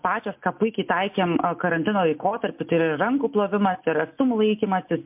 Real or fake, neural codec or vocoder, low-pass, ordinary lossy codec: real; none; 3.6 kHz; MP3, 32 kbps